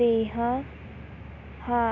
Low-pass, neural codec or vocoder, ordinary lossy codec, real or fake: 7.2 kHz; none; none; real